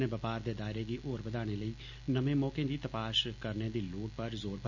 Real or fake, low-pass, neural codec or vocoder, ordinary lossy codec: real; 7.2 kHz; none; none